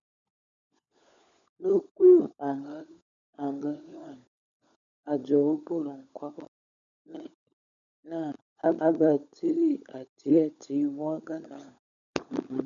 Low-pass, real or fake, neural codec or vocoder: 7.2 kHz; fake; codec, 16 kHz, 16 kbps, FunCodec, trained on LibriTTS, 50 frames a second